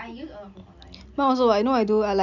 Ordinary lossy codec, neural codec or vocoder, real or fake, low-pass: none; none; real; 7.2 kHz